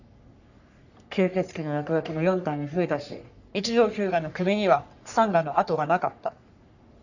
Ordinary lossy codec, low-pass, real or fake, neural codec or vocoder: none; 7.2 kHz; fake; codec, 44.1 kHz, 3.4 kbps, Pupu-Codec